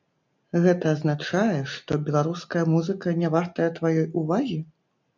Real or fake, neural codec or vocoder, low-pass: real; none; 7.2 kHz